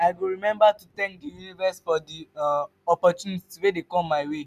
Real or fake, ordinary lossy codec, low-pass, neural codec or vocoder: real; none; 14.4 kHz; none